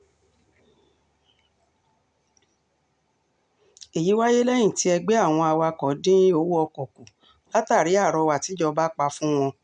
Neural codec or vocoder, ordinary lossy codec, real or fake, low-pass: none; none; real; 9.9 kHz